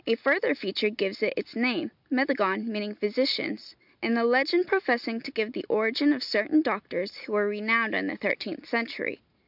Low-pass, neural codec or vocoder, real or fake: 5.4 kHz; none; real